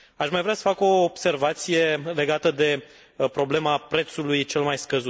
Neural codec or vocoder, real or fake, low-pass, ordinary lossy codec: none; real; none; none